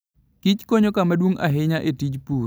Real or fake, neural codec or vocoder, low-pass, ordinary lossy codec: real; none; none; none